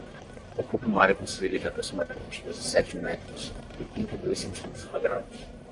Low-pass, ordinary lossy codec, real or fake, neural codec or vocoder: 10.8 kHz; MP3, 96 kbps; fake; codec, 44.1 kHz, 1.7 kbps, Pupu-Codec